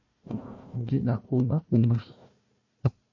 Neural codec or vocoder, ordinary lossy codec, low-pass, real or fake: codec, 16 kHz, 1 kbps, FunCodec, trained on Chinese and English, 50 frames a second; MP3, 32 kbps; 7.2 kHz; fake